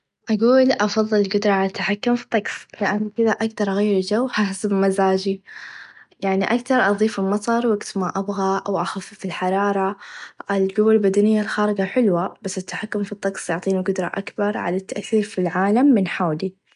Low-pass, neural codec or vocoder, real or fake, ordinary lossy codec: 10.8 kHz; none; real; none